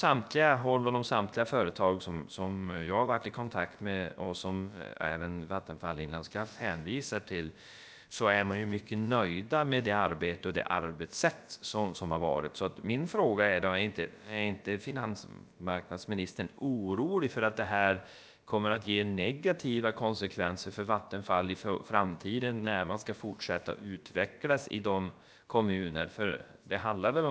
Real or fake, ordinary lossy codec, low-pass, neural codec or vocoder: fake; none; none; codec, 16 kHz, about 1 kbps, DyCAST, with the encoder's durations